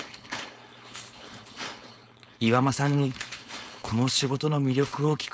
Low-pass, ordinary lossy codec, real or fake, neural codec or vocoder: none; none; fake; codec, 16 kHz, 4.8 kbps, FACodec